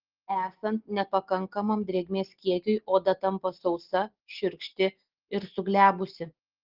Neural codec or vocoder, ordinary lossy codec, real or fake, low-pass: none; Opus, 16 kbps; real; 5.4 kHz